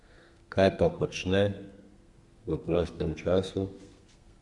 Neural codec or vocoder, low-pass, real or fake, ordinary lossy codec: codec, 32 kHz, 1.9 kbps, SNAC; 10.8 kHz; fake; Opus, 64 kbps